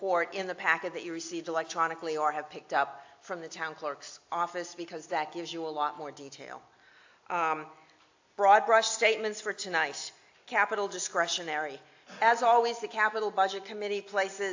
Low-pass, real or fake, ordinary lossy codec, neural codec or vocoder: 7.2 kHz; real; AAC, 48 kbps; none